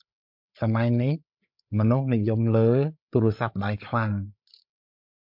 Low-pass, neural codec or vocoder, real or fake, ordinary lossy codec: 5.4 kHz; codec, 16 kHz, 8 kbps, FreqCodec, larger model; fake; MP3, 48 kbps